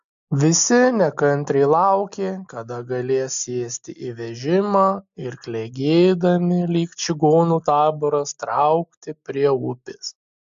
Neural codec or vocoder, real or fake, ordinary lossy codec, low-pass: none; real; AAC, 64 kbps; 7.2 kHz